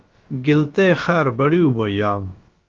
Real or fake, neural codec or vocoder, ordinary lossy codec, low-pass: fake; codec, 16 kHz, about 1 kbps, DyCAST, with the encoder's durations; Opus, 32 kbps; 7.2 kHz